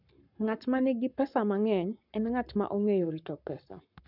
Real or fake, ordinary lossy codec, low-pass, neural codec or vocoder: fake; none; 5.4 kHz; codec, 44.1 kHz, 7.8 kbps, Pupu-Codec